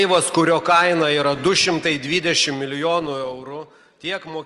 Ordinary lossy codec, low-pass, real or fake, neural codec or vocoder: Opus, 24 kbps; 10.8 kHz; real; none